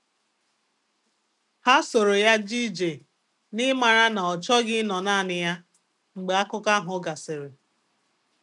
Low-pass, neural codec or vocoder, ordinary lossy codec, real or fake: 10.8 kHz; none; none; real